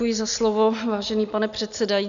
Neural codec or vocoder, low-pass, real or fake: none; 7.2 kHz; real